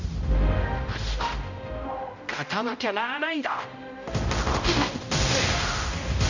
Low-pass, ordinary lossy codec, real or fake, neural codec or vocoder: 7.2 kHz; none; fake; codec, 16 kHz, 0.5 kbps, X-Codec, HuBERT features, trained on general audio